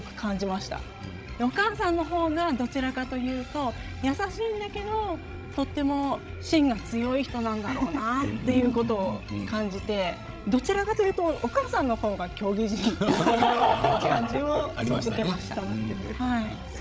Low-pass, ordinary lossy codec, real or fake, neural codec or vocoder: none; none; fake; codec, 16 kHz, 16 kbps, FreqCodec, larger model